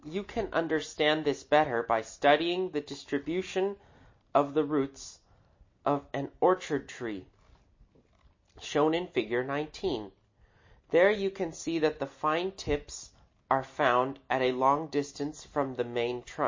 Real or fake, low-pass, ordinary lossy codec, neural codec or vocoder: real; 7.2 kHz; MP3, 32 kbps; none